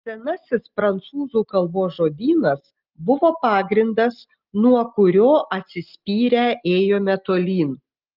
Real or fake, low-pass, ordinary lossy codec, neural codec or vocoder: real; 5.4 kHz; Opus, 24 kbps; none